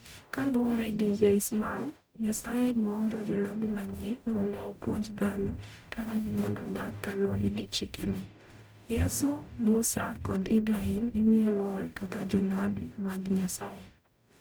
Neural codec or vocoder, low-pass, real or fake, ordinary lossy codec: codec, 44.1 kHz, 0.9 kbps, DAC; none; fake; none